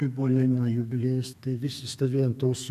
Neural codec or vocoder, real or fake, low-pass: codec, 32 kHz, 1.9 kbps, SNAC; fake; 14.4 kHz